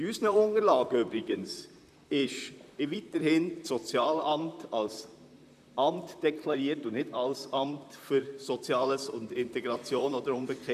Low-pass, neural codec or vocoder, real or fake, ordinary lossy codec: 14.4 kHz; vocoder, 44.1 kHz, 128 mel bands, Pupu-Vocoder; fake; none